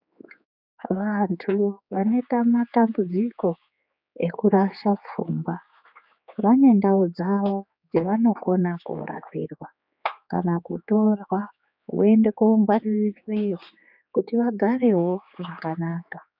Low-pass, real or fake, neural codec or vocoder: 5.4 kHz; fake; codec, 16 kHz, 4 kbps, X-Codec, HuBERT features, trained on general audio